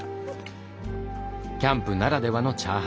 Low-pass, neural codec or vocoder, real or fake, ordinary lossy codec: none; none; real; none